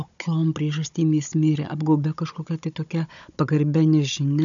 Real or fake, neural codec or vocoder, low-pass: fake; codec, 16 kHz, 16 kbps, FunCodec, trained on Chinese and English, 50 frames a second; 7.2 kHz